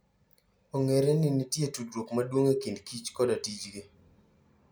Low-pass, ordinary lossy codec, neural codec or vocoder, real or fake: none; none; none; real